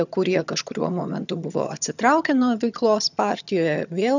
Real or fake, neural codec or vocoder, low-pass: fake; vocoder, 22.05 kHz, 80 mel bands, HiFi-GAN; 7.2 kHz